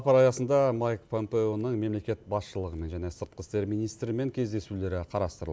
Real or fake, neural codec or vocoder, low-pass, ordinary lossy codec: real; none; none; none